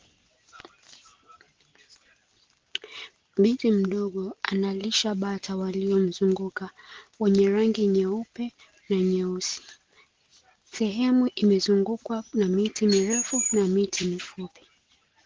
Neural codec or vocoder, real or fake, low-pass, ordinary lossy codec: none; real; 7.2 kHz; Opus, 16 kbps